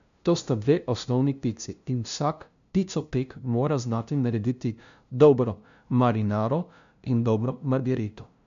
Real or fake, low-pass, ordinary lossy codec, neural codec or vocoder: fake; 7.2 kHz; AAC, 96 kbps; codec, 16 kHz, 0.5 kbps, FunCodec, trained on LibriTTS, 25 frames a second